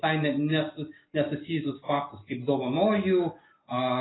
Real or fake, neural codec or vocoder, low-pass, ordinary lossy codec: real; none; 7.2 kHz; AAC, 16 kbps